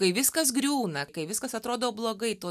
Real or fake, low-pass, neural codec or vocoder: real; 14.4 kHz; none